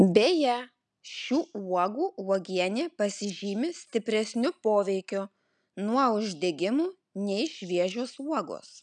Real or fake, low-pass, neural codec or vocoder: real; 10.8 kHz; none